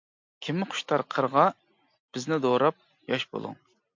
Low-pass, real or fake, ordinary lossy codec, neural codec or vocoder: 7.2 kHz; real; MP3, 48 kbps; none